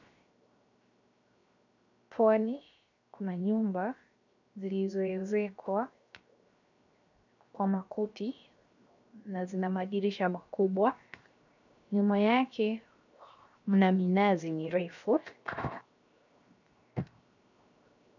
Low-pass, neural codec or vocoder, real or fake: 7.2 kHz; codec, 16 kHz, 0.7 kbps, FocalCodec; fake